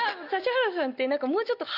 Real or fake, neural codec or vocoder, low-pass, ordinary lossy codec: real; none; 5.4 kHz; none